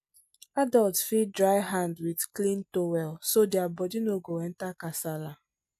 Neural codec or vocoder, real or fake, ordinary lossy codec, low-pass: none; real; none; 14.4 kHz